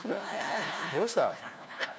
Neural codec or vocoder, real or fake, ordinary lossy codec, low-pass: codec, 16 kHz, 1 kbps, FunCodec, trained on LibriTTS, 50 frames a second; fake; none; none